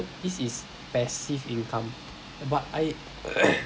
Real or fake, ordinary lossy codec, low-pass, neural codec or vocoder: real; none; none; none